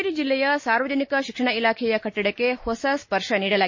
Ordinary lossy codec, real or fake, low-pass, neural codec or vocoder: MP3, 32 kbps; real; 7.2 kHz; none